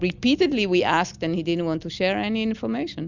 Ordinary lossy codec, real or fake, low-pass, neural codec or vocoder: Opus, 64 kbps; real; 7.2 kHz; none